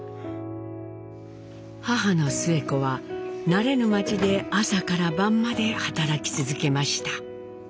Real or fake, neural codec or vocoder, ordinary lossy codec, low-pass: real; none; none; none